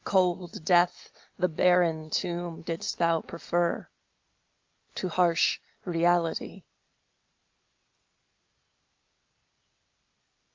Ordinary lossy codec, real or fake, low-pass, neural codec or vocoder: Opus, 24 kbps; real; 7.2 kHz; none